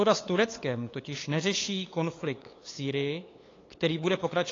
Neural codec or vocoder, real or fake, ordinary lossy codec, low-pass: codec, 16 kHz, 8 kbps, FunCodec, trained on LibriTTS, 25 frames a second; fake; AAC, 32 kbps; 7.2 kHz